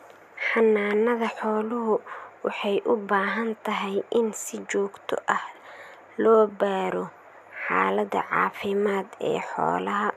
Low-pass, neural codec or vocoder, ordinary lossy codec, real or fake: 14.4 kHz; none; none; real